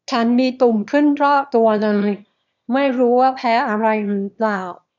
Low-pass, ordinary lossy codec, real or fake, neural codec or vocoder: 7.2 kHz; none; fake; autoencoder, 22.05 kHz, a latent of 192 numbers a frame, VITS, trained on one speaker